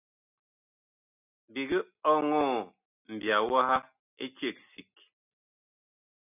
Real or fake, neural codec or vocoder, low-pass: real; none; 3.6 kHz